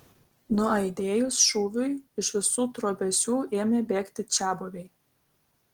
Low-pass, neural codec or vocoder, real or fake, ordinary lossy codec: 19.8 kHz; none; real; Opus, 16 kbps